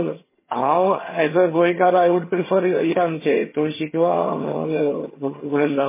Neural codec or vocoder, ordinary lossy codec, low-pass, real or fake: vocoder, 22.05 kHz, 80 mel bands, HiFi-GAN; MP3, 16 kbps; 3.6 kHz; fake